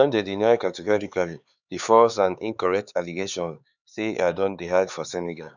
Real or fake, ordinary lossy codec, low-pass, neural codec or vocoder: fake; none; 7.2 kHz; codec, 16 kHz, 4 kbps, X-Codec, HuBERT features, trained on LibriSpeech